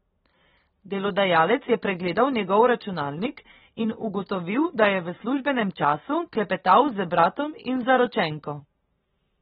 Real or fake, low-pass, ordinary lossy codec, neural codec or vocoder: real; 19.8 kHz; AAC, 16 kbps; none